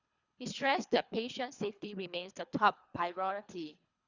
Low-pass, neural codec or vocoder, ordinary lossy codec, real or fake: 7.2 kHz; codec, 24 kHz, 3 kbps, HILCodec; Opus, 64 kbps; fake